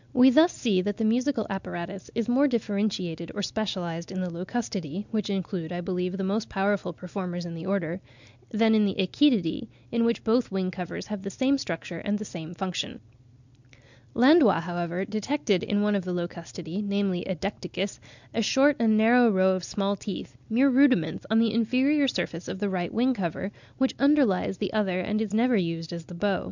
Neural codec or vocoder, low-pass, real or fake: none; 7.2 kHz; real